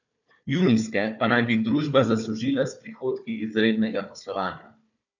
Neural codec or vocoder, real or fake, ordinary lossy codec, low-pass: codec, 16 kHz, 4 kbps, FunCodec, trained on Chinese and English, 50 frames a second; fake; none; 7.2 kHz